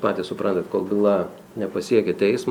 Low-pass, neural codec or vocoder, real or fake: 19.8 kHz; none; real